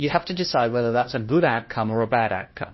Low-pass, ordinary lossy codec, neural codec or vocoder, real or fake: 7.2 kHz; MP3, 24 kbps; codec, 16 kHz, 1 kbps, FunCodec, trained on LibriTTS, 50 frames a second; fake